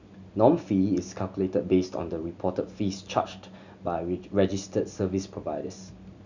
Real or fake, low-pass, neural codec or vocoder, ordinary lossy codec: real; 7.2 kHz; none; none